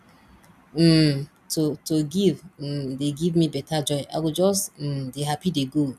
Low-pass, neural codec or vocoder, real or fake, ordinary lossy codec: 14.4 kHz; none; real; none